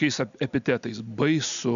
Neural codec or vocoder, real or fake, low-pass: none; real; 7.2 kHz